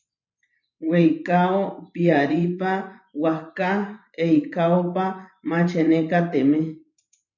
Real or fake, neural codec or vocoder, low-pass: fake; vocoder, 24 kHz, 100 mel bands, Vocos; 7.2 kHz